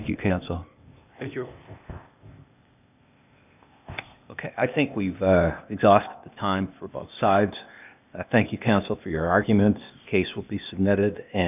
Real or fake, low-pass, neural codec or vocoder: fake; 3.6 kHz; codec, 16 kHz, 0.8 kbps, ZipCodec